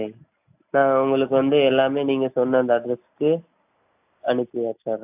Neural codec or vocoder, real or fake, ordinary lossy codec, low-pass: none; real; AAC, 32 kbps; 3.6 kHz